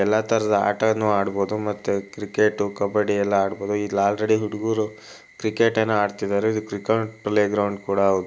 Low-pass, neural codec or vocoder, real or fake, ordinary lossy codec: none; none; real; none